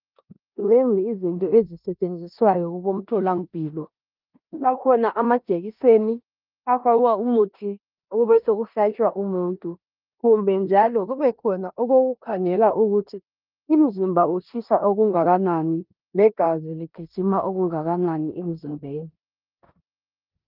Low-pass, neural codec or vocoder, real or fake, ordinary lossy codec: 5.4 kHz; codec, 16 kHz in and 24 kHz out, 0.9 kbps, LongCat-Audio-Codec, four codebook decoder; fake; Opus, 24 kbps